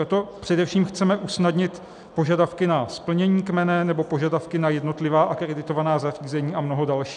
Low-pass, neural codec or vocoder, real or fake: 10.8 kHz; none; real